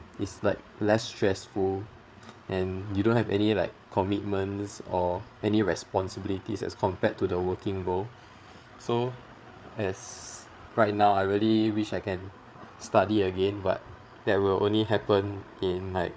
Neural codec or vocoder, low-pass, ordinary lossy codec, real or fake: codec, 16 kHz, 8 kbps, FreqCodec, larger model; none; none; fake